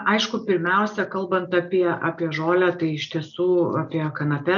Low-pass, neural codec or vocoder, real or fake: 7.2 kHz; none; real